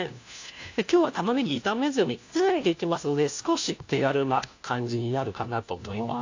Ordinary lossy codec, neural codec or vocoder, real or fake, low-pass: AAC, 48 kbps; codec, 16 kHz, 1 kbps, FunCodec, trained on LibriTTS, 50 frames a second; fake; 7.2 kHz